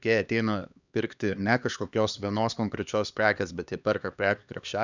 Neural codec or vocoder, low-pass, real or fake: codec, 16 kHz, 2 kbps, X-Codec, HuBERT features, trained on LibriSpeech; 7.2 kHz; fake